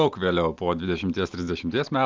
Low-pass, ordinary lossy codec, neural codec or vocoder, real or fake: 7.2 kHz; Opus, 32 kbps; none; real